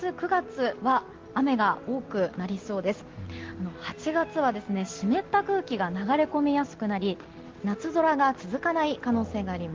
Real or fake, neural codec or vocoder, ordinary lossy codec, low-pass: real; none; Opus, 16 kbps; 7.2 kHz